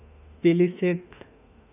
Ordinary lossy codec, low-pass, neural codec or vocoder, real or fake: none; 3.6 kHz; autoencoder, 48 kHz, 32 numbers a frame, DAC-VAE, trained on Japanese speech; fake